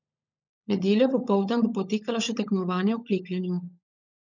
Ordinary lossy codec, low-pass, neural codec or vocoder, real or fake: none; 7.2 kHz; codec, 16 kHz, 16 kbps, FunCodec, trained on LibriTTS, 50 frames a second; fake